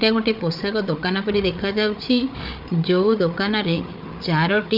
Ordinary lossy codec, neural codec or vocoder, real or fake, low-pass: none; codec, 16 kHz, 8 kbps, FreqCodec, larger model; fake; 5.4 kHz